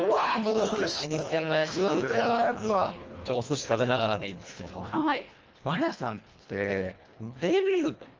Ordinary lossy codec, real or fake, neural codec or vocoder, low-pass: Opus, 24 kbps; fake; codec, 24 kHz, 1.5 kbps, HILCodec; 7.2 kHz